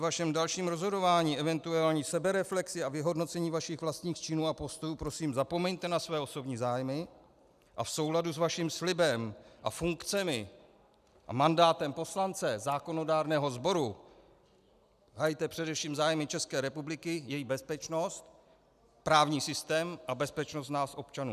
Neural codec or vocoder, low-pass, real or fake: none; 14.4 kHz; real